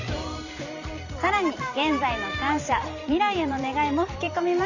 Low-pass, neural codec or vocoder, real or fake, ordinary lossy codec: 7.2 kHz; vocoder, 44.1 kHz, 128 mel bands every 512 samples, BigVGAN v2; fake; none